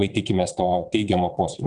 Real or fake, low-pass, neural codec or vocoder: fake; 9.9 kHz; vocoder, 22.05 kHz, 80 mel bands, WaveNeXt